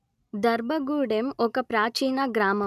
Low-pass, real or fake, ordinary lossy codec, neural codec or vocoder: 14.4 kHz; real; none; none